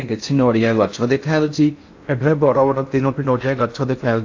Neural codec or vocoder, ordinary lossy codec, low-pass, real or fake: codec, 16 kHz in and 24 kHz out, 0.6 kbps, FocalCodec, streaming, 4096 codes; AAC, 48 kbps; 7.2 kHz; fake